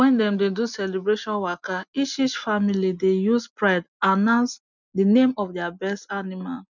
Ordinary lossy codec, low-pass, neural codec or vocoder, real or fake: none; 7.2 kHz; none; real